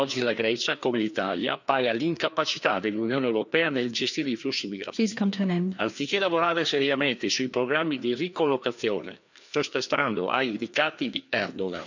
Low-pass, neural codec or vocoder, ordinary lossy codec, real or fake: 7.2 kHz; codec, 16 kHz, 2 kbps, FreqCodec, larger model; none; fake